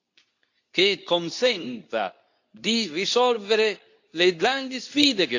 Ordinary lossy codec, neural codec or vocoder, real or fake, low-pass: AAC, 48 kbps; codec, 24 kHz, 0.9 kbps, WavTokenizer, medium speech release version 2; fake; 7.2 kHz